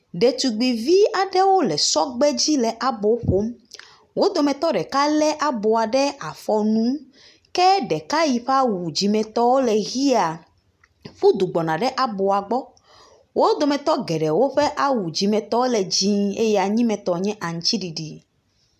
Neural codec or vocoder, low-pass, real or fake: none; 14.4 kHz; real